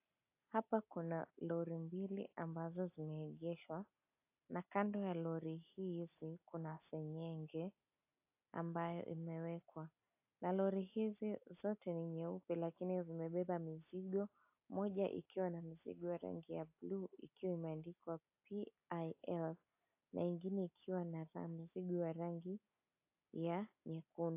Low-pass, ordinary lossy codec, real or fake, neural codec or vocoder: 3.6 kHz; AAC, 32 kbps; real; none